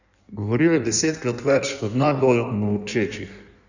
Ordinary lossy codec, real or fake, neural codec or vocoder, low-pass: none; fake; codec, 16 kHz in and 24 kHz out, 1.1 kbps, FireRedTTS-2 codec; 7.2 kHz